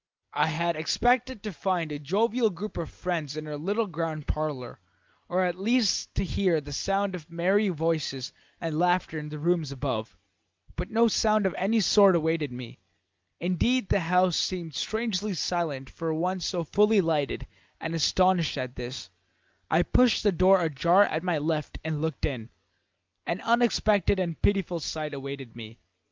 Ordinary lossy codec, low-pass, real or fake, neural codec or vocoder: Opus, 32 kbps; 7.2 kHz; real; none